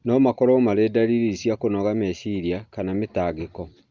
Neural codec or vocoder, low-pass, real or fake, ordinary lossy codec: none; 7.2 kHz; real; Opus, 24 kbps